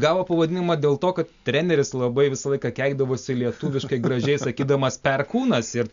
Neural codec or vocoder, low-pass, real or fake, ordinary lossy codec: none; 7.2 kHz; real; MP3, 64 kbps